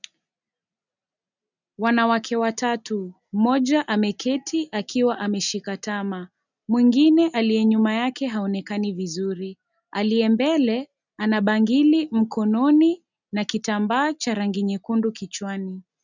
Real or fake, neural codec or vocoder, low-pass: real; none; 7.2 kHz